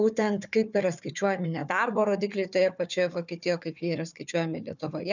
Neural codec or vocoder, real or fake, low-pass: codec, 16 kHz, 4 kbps, FunCodec, trained on Chinese and English, 50 frames a second; fake; 7.2 kHz